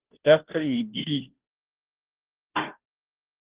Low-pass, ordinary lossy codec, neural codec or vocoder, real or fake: 3.6 kHz; Opus, 16 kbps; codec, 16 kHz, 0.5 kbps, FunCodec, trained on Chinese and English, 25 frames a second; fake